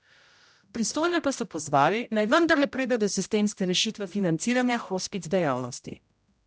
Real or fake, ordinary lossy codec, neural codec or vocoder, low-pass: fake; none; codec, 16 kHz, 0.5 kbps, X-Codec, HuBERT features, trained on general audio; none